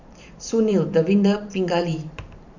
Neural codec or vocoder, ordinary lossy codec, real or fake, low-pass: vocoder, 44.1 kHz, 128 mel bands every 512 samples, BigVGAN v2; none; fake; 7.2 kHz